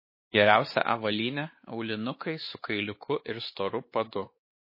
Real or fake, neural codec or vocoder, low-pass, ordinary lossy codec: fake; codec, 16 kHz, 4 kbps, X-Codec, WavLM features, trained on Multilingual LibriSpeech; 5.4 kHz; MP3, 24 kbps